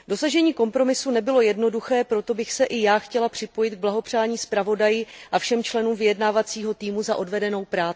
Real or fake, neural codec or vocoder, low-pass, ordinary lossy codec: real; none; none; none